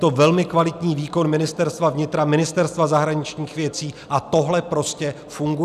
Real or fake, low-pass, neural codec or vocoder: real; 14.4 kHz; none